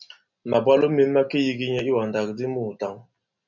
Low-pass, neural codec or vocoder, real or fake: 7.2 kHz; none; real